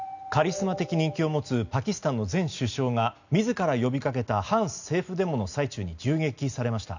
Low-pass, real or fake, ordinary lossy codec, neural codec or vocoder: 7.2 kHz; real; none; none